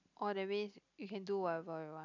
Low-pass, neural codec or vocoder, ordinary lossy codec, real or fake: 7.2 kHz; none; none; real